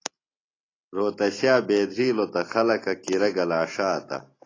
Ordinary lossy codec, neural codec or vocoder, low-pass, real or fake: AAC, 32 kbps; none; 7.2 kHz; real